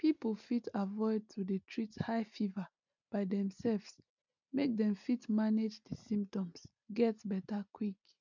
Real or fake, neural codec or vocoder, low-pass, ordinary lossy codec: real; none; 7.2 kHz; none